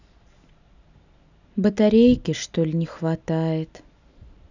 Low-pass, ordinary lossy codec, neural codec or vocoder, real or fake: 7.2 kHz; none; none; real